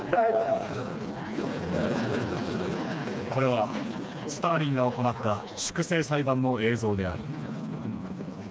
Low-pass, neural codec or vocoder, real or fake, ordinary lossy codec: none; codec, 16 kHz, 2 kbps, FreqCodec, smaller model; fake; none